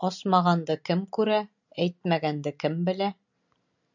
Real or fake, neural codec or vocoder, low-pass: real; none; 7.2 kHz